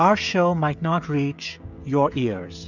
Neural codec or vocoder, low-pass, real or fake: codec, 16 kHz, 16 kbps, FreqCodec, smaller model; 7.2 kHz; fake